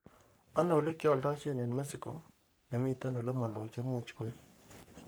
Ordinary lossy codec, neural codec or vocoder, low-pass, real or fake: none; codec, 44.1 kHz, 3.4 kbps, Pupu-Codec; none; fake